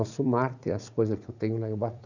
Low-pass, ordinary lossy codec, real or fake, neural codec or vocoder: 7.2 kHz; none; fake; vocoder, 44.1 kHz, 80 mel bands, Vocos